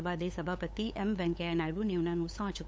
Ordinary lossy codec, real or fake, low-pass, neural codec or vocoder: none; fake; none; codec, 16 kHz, 8 kbps, FunCodec, trained on LibriTTS, 25 frames a second